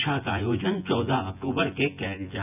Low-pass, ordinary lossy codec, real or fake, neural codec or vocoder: 3.6 kHz; none; fake; vocoder, 24 kHz, 100 mel bands, Vocos